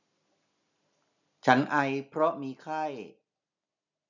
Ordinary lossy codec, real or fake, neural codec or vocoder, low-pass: none; real; none; 7.2 kHz